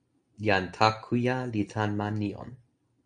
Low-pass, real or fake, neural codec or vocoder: 9.9 kHz; real; none